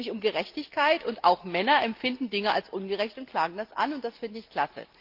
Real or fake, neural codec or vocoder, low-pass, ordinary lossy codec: real; none; 5.4 kHz; Opus, 32 kbps